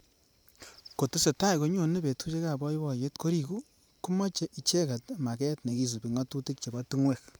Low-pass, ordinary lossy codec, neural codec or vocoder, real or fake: none; none; vocoder, 44.1 kHz, 128 mel bands every 512 samples, BigVGAN v2; fake